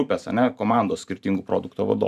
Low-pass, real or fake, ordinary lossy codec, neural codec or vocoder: 14.4 kHz; fake; AAC, 96 kbps; vocoder, 44.1 kHz, 128 mel bands every 512 samples, BigVGAN v2